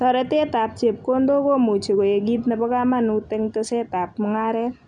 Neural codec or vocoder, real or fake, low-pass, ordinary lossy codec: none; real; 10.8 kHz; none